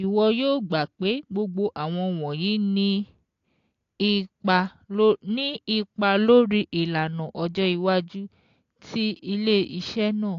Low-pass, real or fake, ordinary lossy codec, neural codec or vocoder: 7.2 kHz; real; AAC, 48 kbps; none